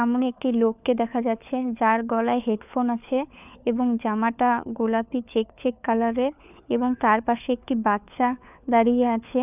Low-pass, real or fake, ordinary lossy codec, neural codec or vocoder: 3.6 kHz; fake; none; codec, 16 kHz, 4 kbps, FunCodec, trained on LibriTTS, 50 frames a second